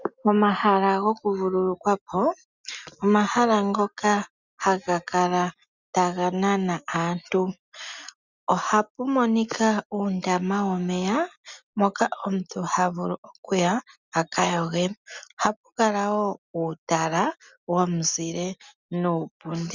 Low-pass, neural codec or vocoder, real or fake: 7.2 kHz; none; real